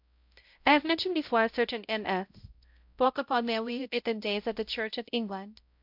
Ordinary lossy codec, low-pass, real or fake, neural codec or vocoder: MP3, 48 kbps; 5.4 kHz; fake; codec, 16 kHz, 0.5 kbps, X-Codec, HuBERT features, trained on balanced general audio